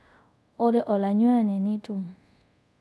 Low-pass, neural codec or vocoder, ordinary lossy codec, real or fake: none; codec, 24 kHz, 0.5 kbps, DualCodec; none; fake